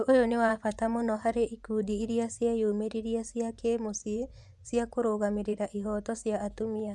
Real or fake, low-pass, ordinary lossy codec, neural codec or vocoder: fake; none; none; vocoder, 24 kHz, 100 mel bands, Vocos